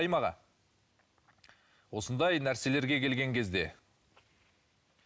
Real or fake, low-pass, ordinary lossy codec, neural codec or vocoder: real; none; none; none